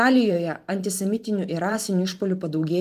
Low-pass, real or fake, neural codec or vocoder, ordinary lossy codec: 14.4 kHz; fake; vocoder, 44.1 kHz, 128 mel bands every 512 samples, BigVGAN v2; Opus, 32 kbps